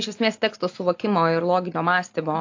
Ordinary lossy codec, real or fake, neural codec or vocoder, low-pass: AAC, 48 kbps; real; none; 7.2 kHz